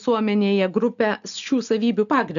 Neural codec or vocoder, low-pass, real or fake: none; 7.2 kHz; real